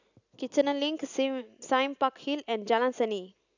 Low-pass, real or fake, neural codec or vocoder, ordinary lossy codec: 7.2 kHz; real; none; none